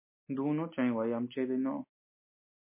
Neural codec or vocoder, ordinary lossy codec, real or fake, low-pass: none; MP3, 24 kbps; real; 3.6 kHz